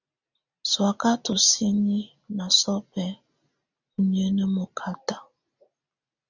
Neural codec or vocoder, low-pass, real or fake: none; 7.2 kHz; real